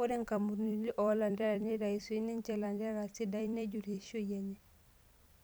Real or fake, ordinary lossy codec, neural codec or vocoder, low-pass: fake; none; vocoder, 44.1 kHz, 128 mel bands every 256 samples, BigVGAN v2; none